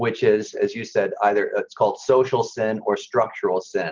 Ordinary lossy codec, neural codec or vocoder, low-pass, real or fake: Opus, 32 kbps; none; 7.2 kHz; real